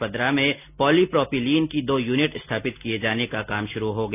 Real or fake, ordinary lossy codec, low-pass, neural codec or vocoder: real; none; 3.6 kHz; none